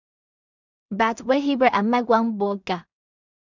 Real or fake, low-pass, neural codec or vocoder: fake; 7.2 kHz; codec, 16 kHz in and 24 kHz out, 0.4 kbps, LongCat-Audio-Codec, two codebook decoder